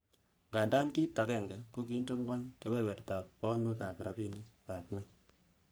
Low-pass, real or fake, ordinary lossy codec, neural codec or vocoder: none; fake; none; codec, 44.1 kHz, 3.4 kbps, Pupu-Codec